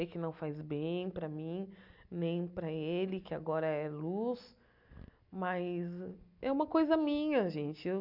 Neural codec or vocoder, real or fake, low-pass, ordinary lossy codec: none; real; 5.4 kHz; none